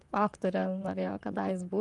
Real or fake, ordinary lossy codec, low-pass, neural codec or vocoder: fake; Opus, 32 kbps; 10.8 kHz; vocoder, 44.1 kHz, 128 mel bands, Pupu-Vocoder